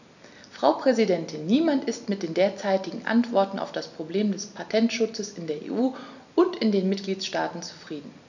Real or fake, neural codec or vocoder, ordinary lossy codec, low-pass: real; none; none; 7.2 kHz